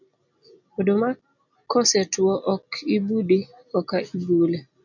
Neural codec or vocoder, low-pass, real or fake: none; 7.2 kHz; real